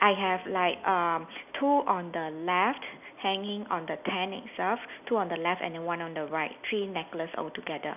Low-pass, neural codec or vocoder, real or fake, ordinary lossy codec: 3.6 kHz; none; real; none